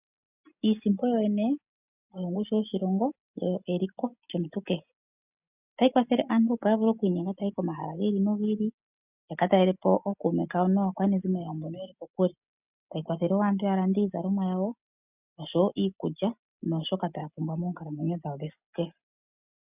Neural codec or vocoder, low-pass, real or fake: none; 3.6 kHz; real